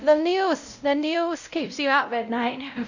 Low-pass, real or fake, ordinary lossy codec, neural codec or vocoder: 7.2 kHz; fake; none; codec, 16 kHz, 0.5 kbps, X-Codec, WavLM features, trained on Multilingual LibriSpeech